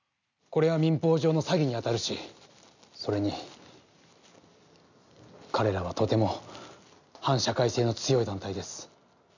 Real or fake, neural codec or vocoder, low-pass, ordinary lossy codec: real; none; 7.2 kHz; none